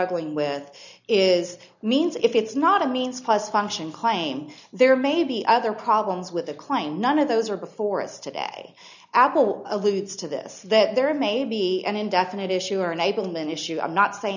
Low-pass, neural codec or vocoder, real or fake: 7.2 kHz; none; real